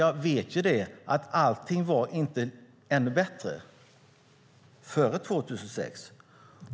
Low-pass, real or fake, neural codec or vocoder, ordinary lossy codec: none; real; none; none